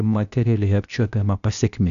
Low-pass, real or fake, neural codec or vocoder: 7.2 kHz; fake; codec, 16 kHz, 0.8 kbps, ZipCodec